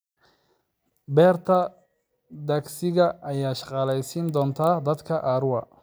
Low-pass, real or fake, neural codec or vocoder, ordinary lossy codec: none; real; none; none